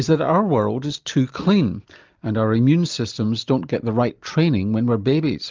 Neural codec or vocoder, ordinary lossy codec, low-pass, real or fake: none; Opus, 24 kbps; 7.2 kHz; real